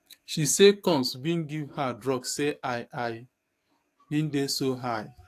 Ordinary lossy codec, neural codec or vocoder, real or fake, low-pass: AAC, 64 kbps; codec, 44.1 kHz, 7.8 kbps, DAC; fake; 14.4 kHz